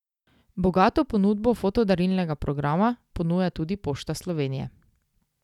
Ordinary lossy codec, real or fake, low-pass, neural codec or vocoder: none; real; 19.8 kHz; none